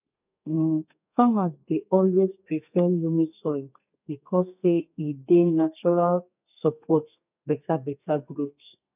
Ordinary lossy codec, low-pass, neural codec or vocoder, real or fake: none; 3.6 kHz; codec, 32 kHz, 1.9 kbps, SNAC; fake